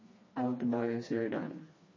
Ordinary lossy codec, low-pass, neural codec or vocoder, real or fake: MP3, 32 kbps; 7.2 kHz; codec, 16 kHz, 2 kbps, FreqCodec, smaller model; fake